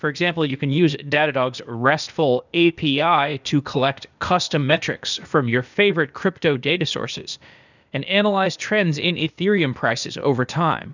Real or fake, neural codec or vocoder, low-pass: fake; codec, 16 kHz, 0.8 kbps, ZipCodec; 7.2 kHz